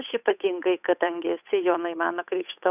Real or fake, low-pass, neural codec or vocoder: fake; 3.6 kHz; codec, 16 kHz, 8 kbps, FunCodec, trained on Chinese and English, 25 frames a second